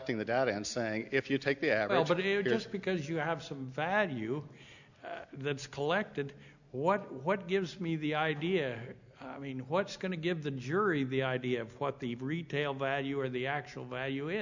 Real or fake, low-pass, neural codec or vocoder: real; 7.2 kHz; none